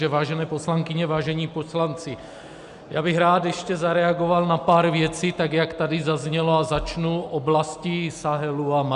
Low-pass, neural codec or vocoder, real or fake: 10.8 kHz; none; real